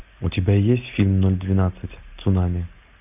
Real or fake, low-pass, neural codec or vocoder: real; 3.6 kHz; none